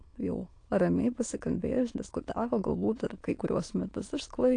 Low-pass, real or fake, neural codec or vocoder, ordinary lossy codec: 9.9 kHz; fake; autoencoder, 22.05 kHz, a latent of 192 numbers a frame, VITS, trained on many speakers; AAC, 48 kbps